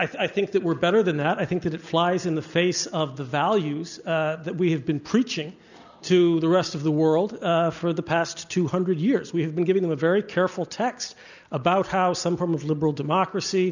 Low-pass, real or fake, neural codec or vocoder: 7.2 kHz; real; none